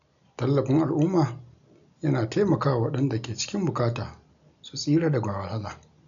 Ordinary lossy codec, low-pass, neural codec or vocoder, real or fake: none; 7.2 kHz; none; real